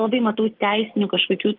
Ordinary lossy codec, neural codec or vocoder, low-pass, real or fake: Opus, 32 kbps; none; 5.4 kHz; real